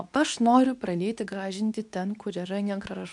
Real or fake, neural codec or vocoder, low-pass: fake; codec, 24 kHz, 0.9 kbps, WavTokenizer, medium speech release version 2; 10.8 kHz